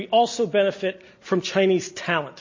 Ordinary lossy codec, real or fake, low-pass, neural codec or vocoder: MP3, 32 kbps; real; 7.2 kHz; none